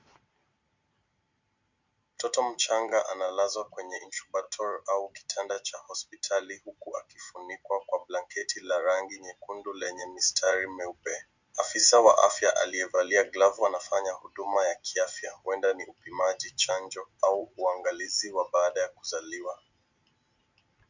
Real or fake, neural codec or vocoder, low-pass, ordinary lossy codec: real; none; 7.2 kHz; Opus, 64 kbps